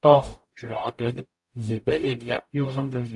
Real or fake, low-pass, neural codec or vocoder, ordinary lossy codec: fake; 10.8 kHz; codec, 44.1 kHz, 0.9 kbps, DAC; AAC, 64 kbps